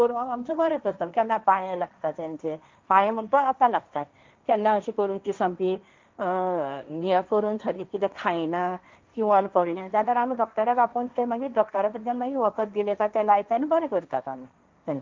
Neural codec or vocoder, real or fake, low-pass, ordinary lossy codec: codec, 16 kHz, 1.1 kbps, Voila-Tokenizer; fake; 7.2 kHz; Opus, 24 kbps